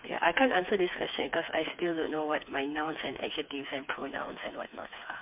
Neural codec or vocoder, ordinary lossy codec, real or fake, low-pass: codec, 16 kHz, 4 kbps, FreqCodec, smaller model; MP3, 32 kbps; fake; 3.6 kHz